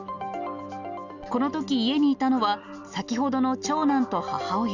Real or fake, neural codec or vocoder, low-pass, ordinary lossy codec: real; none; 7.2 kHz; none